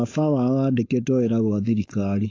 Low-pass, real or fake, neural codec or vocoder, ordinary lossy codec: 7.2 kHz; fake; codec, 16 kHz, 4.8 kbps, FACodec; AAC, 32 kbps